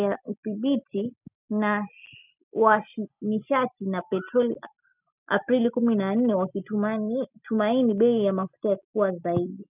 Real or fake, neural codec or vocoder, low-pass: real; none; 3.6 kHz